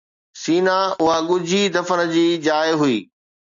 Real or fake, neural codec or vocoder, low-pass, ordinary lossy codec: real; none; 7.2 kHz; AAC, 64 kbps